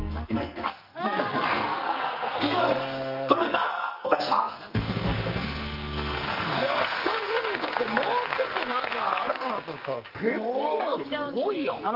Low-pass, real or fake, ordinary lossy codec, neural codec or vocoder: 5.4 kHz; fake; Opus, 32 kbps; codec, 44.1 kHz, 2.6 kbps, SNAC